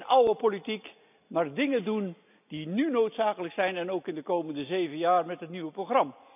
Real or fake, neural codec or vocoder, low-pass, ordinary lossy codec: real; none; 3.6 kHz; none